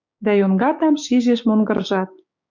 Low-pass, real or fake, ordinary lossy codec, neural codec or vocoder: 7.2 kHz; fake; MP3, 64 kbps; codec, 16 kHz, 6 kbps, DAC